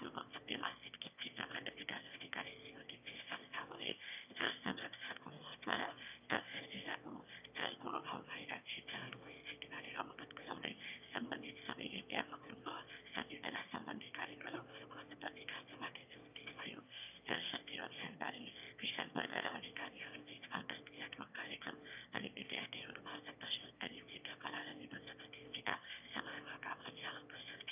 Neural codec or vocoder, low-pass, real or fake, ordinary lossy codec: autoencoder, 22.05 kHz, a latent of 192 numbers a frame, VITS, trained on one speaker; 3.6 kHz; fake; none